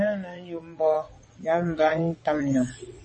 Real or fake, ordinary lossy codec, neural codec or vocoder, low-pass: fake; MP3, 32 kbps; vocoder, 22.05 kHz, 80 mel bands, WaveNeXt; 9.9 kHz